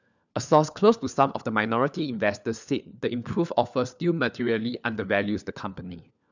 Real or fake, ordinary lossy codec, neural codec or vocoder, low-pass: fake; none; codec, 16 kHz, 4 kbps, FunCodec, trained on LibriTTS, 50 frames a second; 7.2 kHz